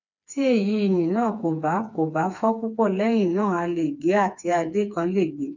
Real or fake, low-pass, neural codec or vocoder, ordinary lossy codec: fake; 7.2 kHz; codec, 16 kHz, 4 kbps, FreqCodec, smaller model; none